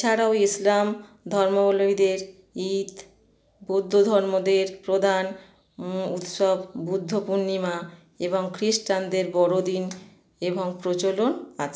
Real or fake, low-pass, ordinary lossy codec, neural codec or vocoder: real; none; none; none